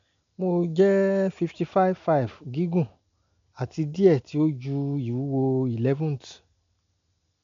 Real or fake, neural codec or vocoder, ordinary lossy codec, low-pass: real; none; MP3, 64 kbps; 7.2 kHz